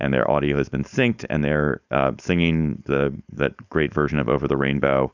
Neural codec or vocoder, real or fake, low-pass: codec, 16 kHz, 4.8 kbps, FACodec; fake; 7.2 kHz